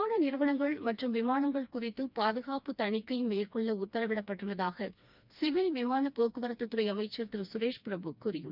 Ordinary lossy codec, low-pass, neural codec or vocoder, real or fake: none; 5.4 kHz; codec, 16 kHz, 2 kbps, FreqCodec, smaller model; fake